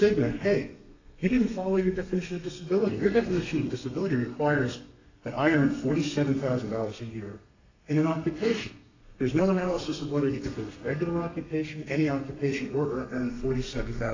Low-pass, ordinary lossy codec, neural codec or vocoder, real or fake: 7.2 kHz; AAC, 48 kbps; codec, 32 kHz, 1.9 kbps, SNAC; fake